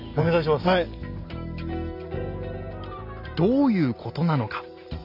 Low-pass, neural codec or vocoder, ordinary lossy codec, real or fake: 5.4 kHz; none; none; real